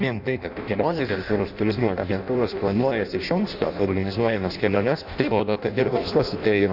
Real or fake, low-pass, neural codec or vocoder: fake; 5.4 kHz; codec, 16 kHz in and 24 kHz out, 0.6 kbps, FireRedTTS-2 codec